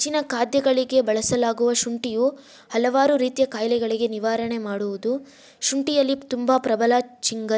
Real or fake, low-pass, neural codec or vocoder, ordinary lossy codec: real; none; none; none